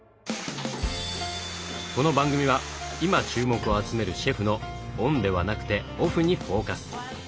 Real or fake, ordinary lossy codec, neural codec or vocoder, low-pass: real; none; none; none